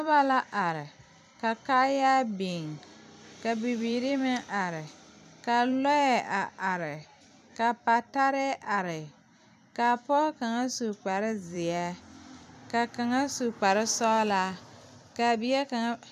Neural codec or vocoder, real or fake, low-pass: vocoder, 44.1 kHz, 128 mel bands every 256 samples, BigVGAN v2; fake; 14.4 kHz